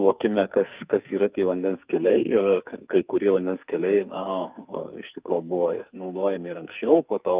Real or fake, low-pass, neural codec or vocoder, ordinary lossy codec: fake; 3.6 kHz; codec, 44.1 kHz, 2.6 kbps, SNAC; Opus, 24 kbps